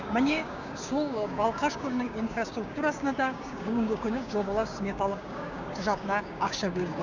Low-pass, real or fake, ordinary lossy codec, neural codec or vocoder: 7.2 kHz; fake; none; codec, 16 kHz, 6 kbps, DAC